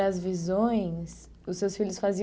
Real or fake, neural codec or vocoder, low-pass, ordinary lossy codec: real; none; none; none